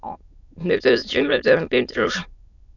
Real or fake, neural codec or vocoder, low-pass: fake; autoencoder, 22.05 kHz, a latent of 192 numbers a frame, VITS, trained on many speakers; 7.2 kHz